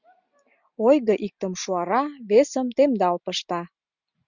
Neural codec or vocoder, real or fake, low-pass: none; real; 7.2 kHz